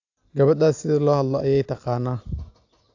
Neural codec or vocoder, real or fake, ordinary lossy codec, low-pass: none; real; AAC, 48 kbps; 7.2 kHz